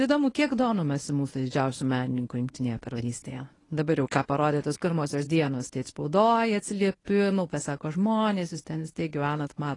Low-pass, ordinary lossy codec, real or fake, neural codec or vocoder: 10.8 kHz; AAC, 32 kbps; fake; codec, 24 kHz, 0.9 kbps, WavTokenizer, medium speech release version 1